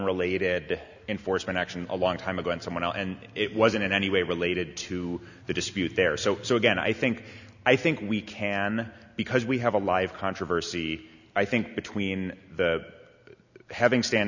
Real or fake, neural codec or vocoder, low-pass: real; none; 7.2 kHz